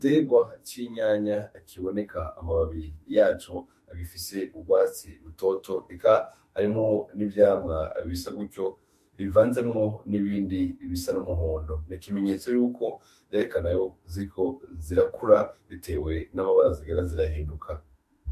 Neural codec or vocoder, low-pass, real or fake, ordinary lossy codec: autoencoder, 48 kHz, 32 numbers a frame, DAC-VAE, trained on Japanese speech; 14.4 kHz; fake; MP3, 64 kbps